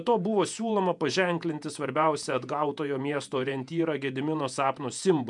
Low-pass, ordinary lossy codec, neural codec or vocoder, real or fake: 10.8 kHz; MP3, 96 kbps; vocoder, 48 kHz, 128 mel bands, Vocos; fake